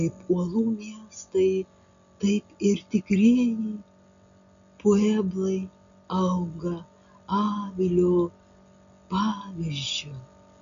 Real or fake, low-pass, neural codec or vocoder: real; 7.2 kHz; none